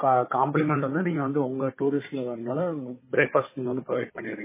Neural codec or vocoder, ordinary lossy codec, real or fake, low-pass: codec, 16 kHz, 4 kbps, FunCodec, trained on Chinese and English, 50 frames a second; MP3, 16 kbps; fake; 3.6 kHz